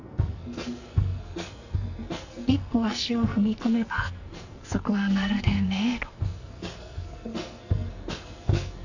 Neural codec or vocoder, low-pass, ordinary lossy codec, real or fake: codec, 44.1 kHz, 2.6 kbps, SNAC; 7.2 kHz; none; fake